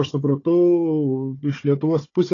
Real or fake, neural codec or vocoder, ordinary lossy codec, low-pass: fake; codec, 16 kHz, 4 kbps, FunCodec, trained on Chinese and English, 50 frames a second; AAC, 32 kbps; 7.2 kHz